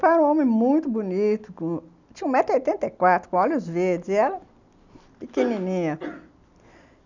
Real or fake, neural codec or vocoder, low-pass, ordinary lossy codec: real; none; 7.2 kHz; none